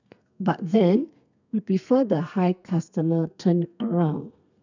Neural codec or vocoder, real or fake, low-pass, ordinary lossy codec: codec, 32 kHz, 1.9 kbps, SNAC; fake; 7.2 kHz; none